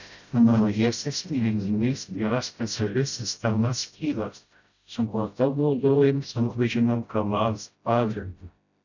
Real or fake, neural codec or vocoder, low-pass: fake; codec, 16 kHz, 0.5 kbps, FreqCodec, smaller model; 7.2 kHz